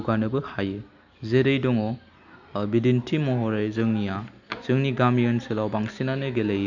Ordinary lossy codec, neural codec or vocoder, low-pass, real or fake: none; none; 7.2 kHz; real